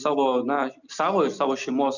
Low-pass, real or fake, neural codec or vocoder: 7.2 kHz; real; none